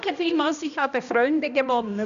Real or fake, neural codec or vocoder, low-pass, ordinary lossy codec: fake; codec, 16 kHz, 1 kbps, X-Codec, HuBERT features, trained on balanced general audio; 7.2 kHz; none